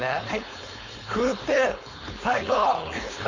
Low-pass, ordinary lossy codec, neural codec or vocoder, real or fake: 7.2 kHz; MP3, 64 kbps; codec, 16 kHz, 4.8 kbps, FACodec; fake